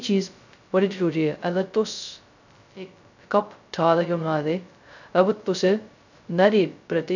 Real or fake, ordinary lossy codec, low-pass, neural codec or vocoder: fake; none; 7.2 kHz; codec, 16 kHz, 0.2 kbps, FocalCodec